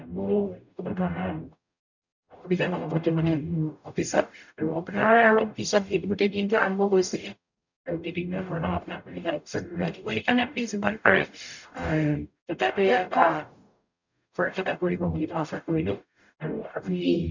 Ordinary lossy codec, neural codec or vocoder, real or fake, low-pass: none; codec, 44.1 kHz, 0.9 kbps, DAC; fake; 7.2 kHz